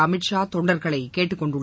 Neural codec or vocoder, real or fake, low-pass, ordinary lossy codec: none; real; none; none